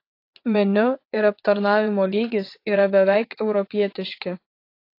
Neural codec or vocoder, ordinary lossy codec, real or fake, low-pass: vocoder, 22.05 kHz, 80 mel bands, WaveNeXt; AAC, 32 kbps; fake; 5.4 kHz